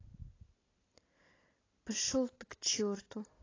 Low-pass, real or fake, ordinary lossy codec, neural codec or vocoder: 7.2 kHz; real; AAC, 32 kbps; none